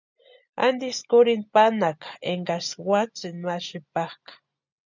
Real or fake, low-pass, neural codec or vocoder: real; 7.2 kHz; none